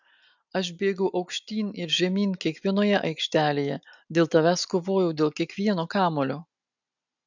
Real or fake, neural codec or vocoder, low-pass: real; none; 7.2 kHz